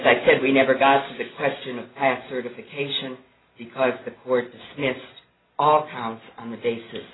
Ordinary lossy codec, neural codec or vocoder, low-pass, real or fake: AAC, 16 kbps; none; 7.2 kHz; real